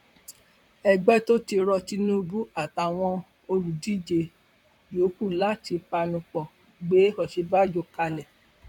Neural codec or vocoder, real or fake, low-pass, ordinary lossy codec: vocoder, 44.1 kHz, 128 mel bands, Pupu-Vocoder; fake; 19.8 kHz; none